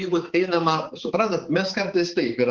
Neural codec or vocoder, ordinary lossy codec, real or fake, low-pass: codec, 16 kHz, 4 kbps, X-Codec, HuBERT features, trained on balanced general audio; Opus, 16 kbps; fake; 7.2 kHz